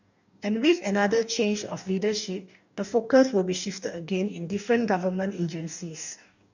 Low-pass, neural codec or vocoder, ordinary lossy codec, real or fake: 7.2 kHz; codec, 44.1 kHz, 2.6 kbps, DAC; none; fake